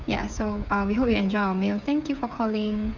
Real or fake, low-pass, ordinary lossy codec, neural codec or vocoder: fake; 7.2 kHz; none; codec, 16 kHz, 8 kbps, FreqCodec, smaller model